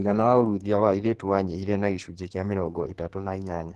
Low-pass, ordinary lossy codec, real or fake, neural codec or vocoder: 14.4 kHz; Opus, 16 kbps; fake; codec, 44.1 kHz, 2.6 kbps, SNAC